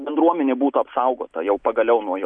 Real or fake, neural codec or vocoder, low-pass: real; none; 9.9 kHz